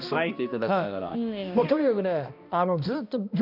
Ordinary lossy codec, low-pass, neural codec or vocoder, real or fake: none; 5.4 kHz; codec, 16 kHz, 1 kbps, X-Codec, HuBERT features, trained on balanced general audio; fake